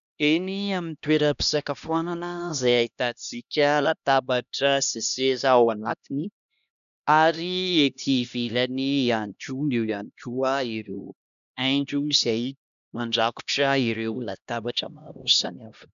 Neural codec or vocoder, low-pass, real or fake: codec, 16 kHz, 1 kbps, X-Codec, HuBERT features, trained on LibriSpeech; 7.2 kHz; fake